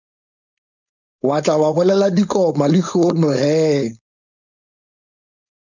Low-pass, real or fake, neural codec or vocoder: 7.2 kHz; fake; codec, 16 kHz, 4.8 kbps, FACodec